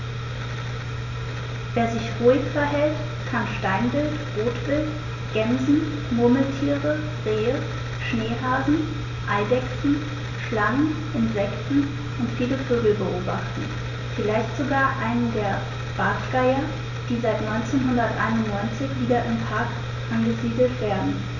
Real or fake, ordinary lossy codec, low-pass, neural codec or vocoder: real; none; 7.2 kHz; none